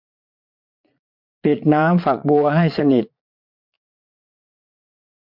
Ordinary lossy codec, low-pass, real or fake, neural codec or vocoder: MP3, 48 kbps; 5.4 kHz; fake; vocoder, 22.05 kHz, 80 mel bands, Vocos